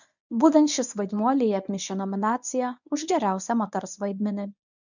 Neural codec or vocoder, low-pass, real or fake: codec, 24 kHz, 0.9 kbps, WavTokenizer, medium speech release version 1; 7.2 kHz; fake